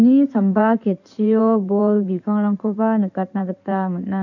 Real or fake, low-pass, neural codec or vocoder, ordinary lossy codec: fake; 7.2 kHz; codec, 16 kHz in and 24 kHz out, 2.2 kbps, FireRedTTS-2 codec; none